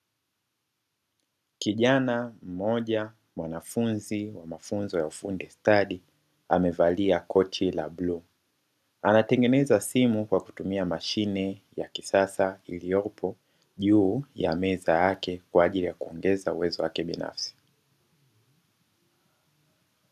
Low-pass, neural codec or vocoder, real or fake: 14.4 kHz; none; real